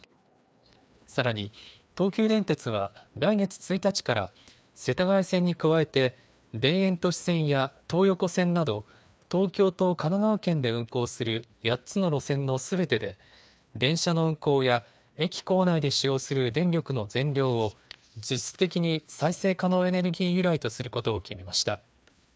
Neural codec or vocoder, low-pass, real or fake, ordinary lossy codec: codec, 16 kHz, 2 kbps, FreqCodec, larger model; none; fake; none